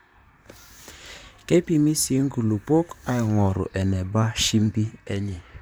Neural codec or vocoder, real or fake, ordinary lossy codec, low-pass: vocoder, 44.1 kHz, 128 mel bands, Pupu-Vocoder; fake; none; none